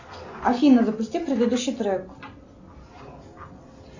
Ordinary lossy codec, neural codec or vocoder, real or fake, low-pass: AAC, 48 kbps; none; real; 7.2 kHz